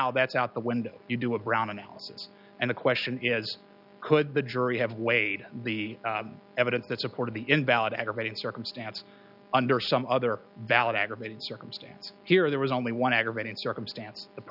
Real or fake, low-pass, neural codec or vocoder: real; 5.4 kHz; none